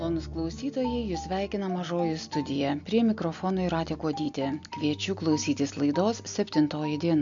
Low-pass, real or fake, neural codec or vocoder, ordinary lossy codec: 7.2 kHz; real; none; MP3, 64 kbps